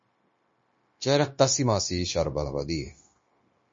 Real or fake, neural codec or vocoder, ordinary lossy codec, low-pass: fake; codec, 16 kHz, 0.9 kbps, LongCat-Audio-Codec; MP3, 32 kbps; 7.2 kHz